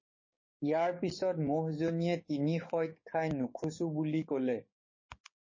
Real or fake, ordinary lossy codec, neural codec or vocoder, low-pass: fake; MP3, 32 kbps; codec, 16 kHz, 6 kbps, DAC; 7.2 kHz